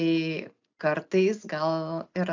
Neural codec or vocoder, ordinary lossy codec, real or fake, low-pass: none; AAC, 48 kbps; real; 7.2 kHz